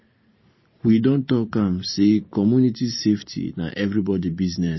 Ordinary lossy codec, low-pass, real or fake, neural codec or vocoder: MP3, 24 kbps; 7.2 kHz; fake; vocoder, 44.1 kHz, 128 mel bands every 512 samples, BigVGAN v2